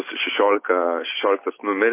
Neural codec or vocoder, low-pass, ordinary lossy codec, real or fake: none; 3.6 kHz; MP3, 24 kbps; real